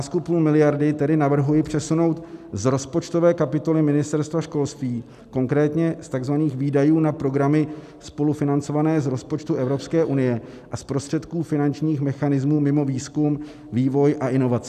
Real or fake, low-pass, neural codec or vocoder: real; 14.4 kHz; none